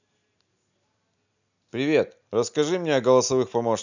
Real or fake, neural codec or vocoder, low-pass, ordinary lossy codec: real; none; 7.2 kHz; none